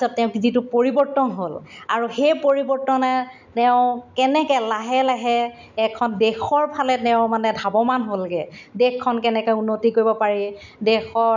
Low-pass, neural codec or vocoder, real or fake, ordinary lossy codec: 7.2 kHz; none; real; none